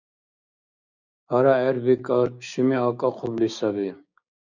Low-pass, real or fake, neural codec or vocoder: 7.2 kHz; fake; codec, 16 kHz, 6 kbps, DAC